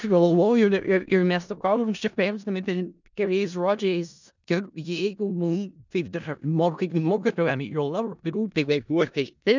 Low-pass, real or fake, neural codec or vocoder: 7.2 kHz; fake; codec, 16 kHz in and 24 kHz out, 0.4 kbps, LongCat-Audio-Codec, four codebook decoder